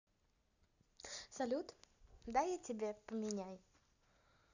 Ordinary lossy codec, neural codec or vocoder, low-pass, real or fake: none; none; 7.2 kHz; real